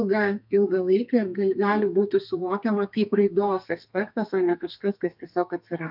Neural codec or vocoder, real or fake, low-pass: codec, 32 kHz, 1.9 kbps, SNAC; fake; 5.4 kHz